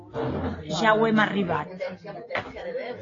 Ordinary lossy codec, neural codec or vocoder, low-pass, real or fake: AAC, 32 kbps; none; 7.2 kHz; real